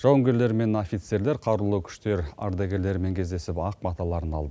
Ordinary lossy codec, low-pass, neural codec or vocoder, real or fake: none; none; none; real